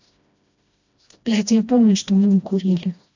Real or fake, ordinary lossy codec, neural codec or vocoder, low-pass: fake; AAC, 48 kbps; codec, 16 kHz, 1 kbps, FreqCodec, smaller model; 7.2 kHz